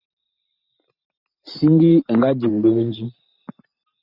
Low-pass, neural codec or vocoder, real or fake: 5.4 kHz; vocoder, 44.1 kHz, 128 mel bands every 512 samples, BigVGAN v2; fake